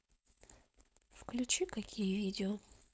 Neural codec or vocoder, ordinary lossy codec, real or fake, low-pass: codec, 16 kHz, 4.8 kbps, FACodec; none; fake; none